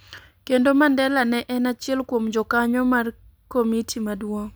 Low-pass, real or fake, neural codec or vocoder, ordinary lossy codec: none; real; none; none